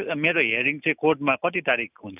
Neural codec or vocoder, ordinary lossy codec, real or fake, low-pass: none; none; real; 3.6 kHz